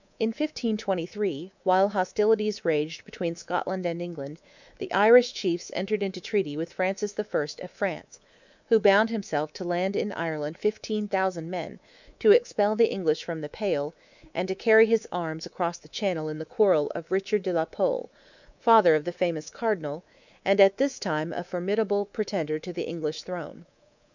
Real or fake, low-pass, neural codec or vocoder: fake; 7.2 kHz; codec, 24 kHz, 3.1 kbps, DualCodec